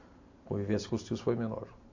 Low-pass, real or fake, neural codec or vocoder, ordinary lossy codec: 7.2 kHz; real; none; AAC, 48 kbps